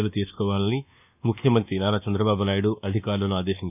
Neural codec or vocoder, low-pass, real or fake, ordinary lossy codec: codec, 24 kHz, 1.2 kbps, DualCodec; 3.6 kHz; fake; none